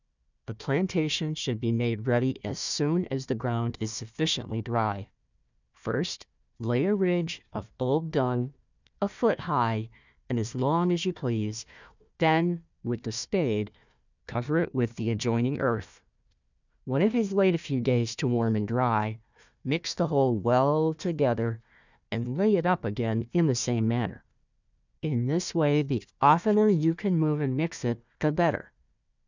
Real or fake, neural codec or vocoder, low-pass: fake; codec, 16 kHz, 1 kbps, FunCodec, trained on Chinese and English, 50 frames a second; 7.2 kHz